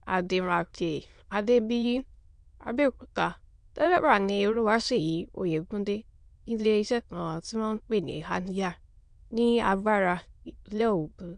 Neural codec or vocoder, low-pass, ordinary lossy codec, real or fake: autoencoder, 22.05 kHz, a latent of 192 numbers a frame, VITS, trained on many speakers; 9.9 kHz; MP3, 64 kbps; fake